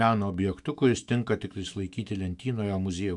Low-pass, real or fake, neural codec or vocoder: 10.8 kHz; fake; autoencoder, 48 kHz, 128 numbers a frame, DAC-VAE, trained on Japanese speech